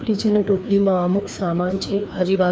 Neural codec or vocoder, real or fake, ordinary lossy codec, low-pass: codec, 16 kHz, 2 kbps, FreqCodec, larger model; fake; none; none